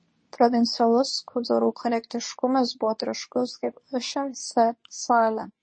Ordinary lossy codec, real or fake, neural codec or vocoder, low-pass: MP3, 32 kbps; fake; codec, 24 kHz, 0.9 kbps, WavTokenizer, medium speech release version 2; 9.9 kHz